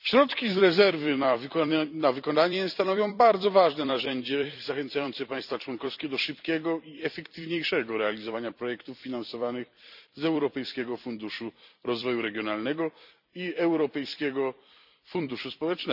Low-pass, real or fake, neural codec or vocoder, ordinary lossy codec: 5.4 kHz; fake; vocoder, 44.1 kHz, 128 mel bands every 256 samples, BigVGAN v2; none